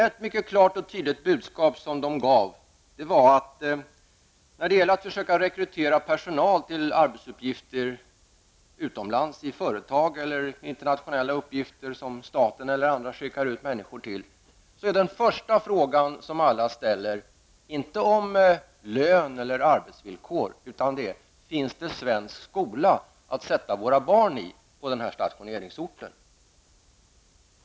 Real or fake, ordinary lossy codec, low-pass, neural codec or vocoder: real; none; none; none